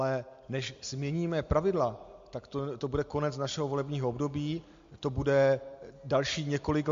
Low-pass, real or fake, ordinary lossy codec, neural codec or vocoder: 7.2 kHz; real; MP3, 48 kbps; none